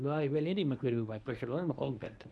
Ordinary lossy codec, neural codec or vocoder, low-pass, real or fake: none; codec, 24 kHz, 0.9 kbps, WavTokenizer, medium speech release version 1; none; fake